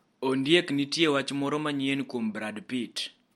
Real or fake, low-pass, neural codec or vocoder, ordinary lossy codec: real; 19.8 kHz; none; MP3, 64 kbps